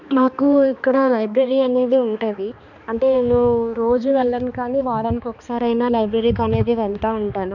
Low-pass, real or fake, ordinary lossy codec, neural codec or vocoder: 7.2 kHz; fake; none; codec, 16 kHz, 2 kbps, X-Codec, HuBERT features, trained on balanced general audio